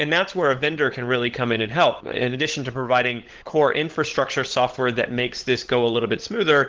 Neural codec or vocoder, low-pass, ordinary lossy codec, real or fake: codec, 16 kHz, 16 kbps, FunCodec, trained on LibriTTS, 50 frames a second; 7.2 kHz; Opus, 16 kbps; fake